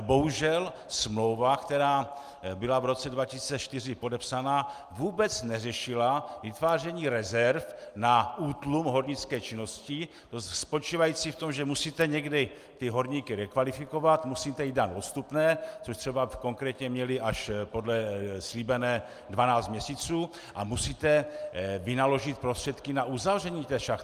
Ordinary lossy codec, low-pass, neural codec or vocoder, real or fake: Opus, 32 kbps; 14.4 kHz; none; real